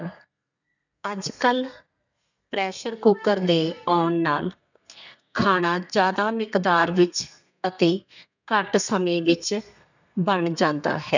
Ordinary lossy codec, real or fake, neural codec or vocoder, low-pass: none; fake; codec, 32 kHz, 1.9 kbps, SNAC; 7.2 kHz